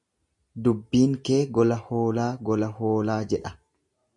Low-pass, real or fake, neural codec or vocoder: 10.8 kHz; real; none